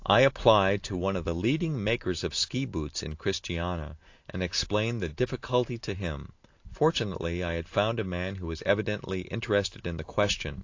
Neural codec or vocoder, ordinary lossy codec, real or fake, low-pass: none; AAC, 48 kbps; real; 7.2 kHz